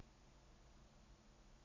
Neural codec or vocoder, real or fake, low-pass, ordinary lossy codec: none; real; 7.2 kHz; AAC, 48 kbps